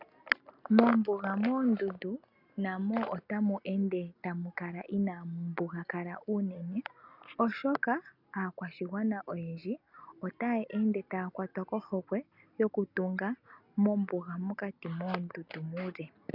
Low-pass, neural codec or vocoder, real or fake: 5.4 kHz; none; real